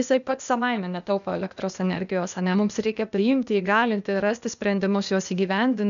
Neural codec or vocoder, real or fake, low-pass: codec, 16 kHz, 0.8 kbps, ZipCodec; fake; 7.2 kHz